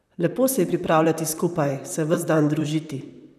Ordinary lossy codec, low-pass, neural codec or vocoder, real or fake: none; 14.4 kHz; vocoder, 44.1 kHz, 128 mel bands, Pupu-Vocoder; fake